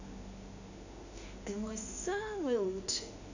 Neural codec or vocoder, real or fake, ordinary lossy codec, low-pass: autoencoder, 48 kHz, 32 numbers a frame, DAC-VAE, trained on Japanese speech; fake; none; 7.2 kHz